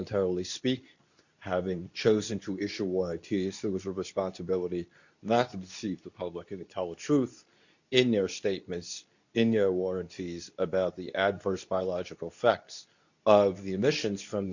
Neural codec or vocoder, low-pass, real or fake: codec, 24 kHz, 0.9 kbps, WavTokenizer, medium speech release version 2; 7.2 kHz; fake